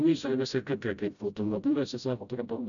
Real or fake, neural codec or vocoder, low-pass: fake; codec, 16 kHz, 0.5 kbps, FreqCodec, smaller model; 7.2 kHz